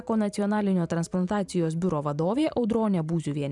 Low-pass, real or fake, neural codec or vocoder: 10.8 kHz; fake; vocoder, 44.1 kHz, 128 mel bands every 512 samples, BigVGAN v2